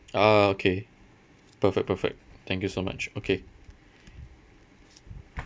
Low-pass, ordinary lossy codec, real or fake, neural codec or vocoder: none; none; real; none